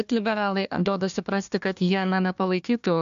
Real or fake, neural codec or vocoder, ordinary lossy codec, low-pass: fake; codec, 16 kHz, 1 kbps, FunCodec, trained on Chinese and English, 50 frames a second; MP3, 64 kbps; 7.2 kHz